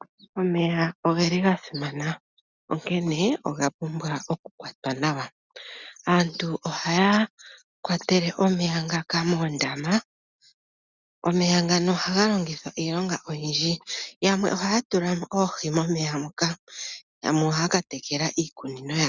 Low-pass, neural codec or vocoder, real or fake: 7.2 kHz; none; real